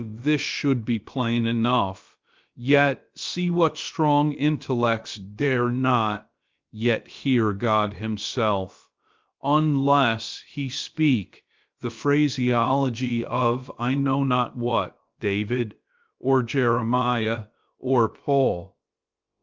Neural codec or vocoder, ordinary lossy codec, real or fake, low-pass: codec, 16 kHz, about 1 kbps, DyCAST, with the encoder's durations; Opus, 32 kbps; fake; 7.2 kHz